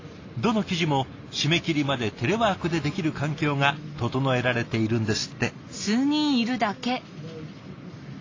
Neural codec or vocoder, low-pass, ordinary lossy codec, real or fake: none; 7.2 kHz; AAC, 32 kbps; real